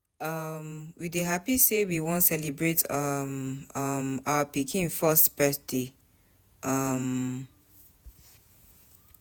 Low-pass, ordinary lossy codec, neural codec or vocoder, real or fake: none; none; vocoder, 48 kHz, 128 mel bands, Vocos; fake